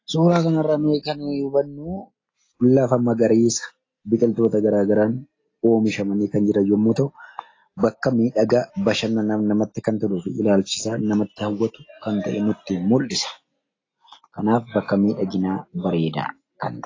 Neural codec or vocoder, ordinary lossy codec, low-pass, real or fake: none; AAC, 32 kbps; 7.2 kHz; real